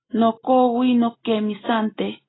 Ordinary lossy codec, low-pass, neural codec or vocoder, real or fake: AAC, 16 kbps; 7.2 kHz; none; real